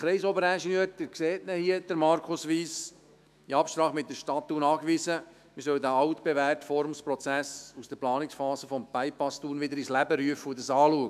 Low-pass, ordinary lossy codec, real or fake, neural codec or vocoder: 14.4 kHz; none; fake; autoencoder, 48 kHz, 128 numbers a frame, DAC-VAE, trained on Japanese speech